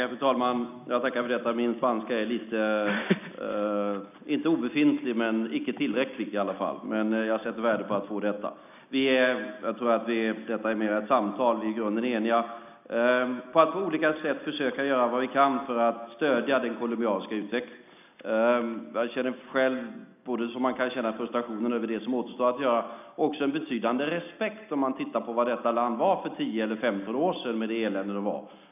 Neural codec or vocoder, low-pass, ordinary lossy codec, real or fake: none; 3.6 kHz; none; real